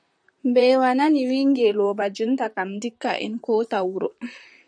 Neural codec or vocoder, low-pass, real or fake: vocoder, 44.1 kHz, 128 mel bands, Pupu-Vocoder; 9.9 kHz; fake